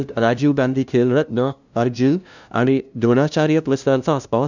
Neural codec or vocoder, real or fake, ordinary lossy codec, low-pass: codec, 16 kHz, 0.5 kbps, FunCodec, trained on LibriTTS, 25 frames a second; fake; none; 7.2 kHz